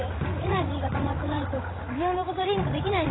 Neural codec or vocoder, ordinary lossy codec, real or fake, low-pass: codec, 16 kHz, 16 kbps, FreqCodec, larger model; AAC, 16 kbps; fake; 7.2 kHz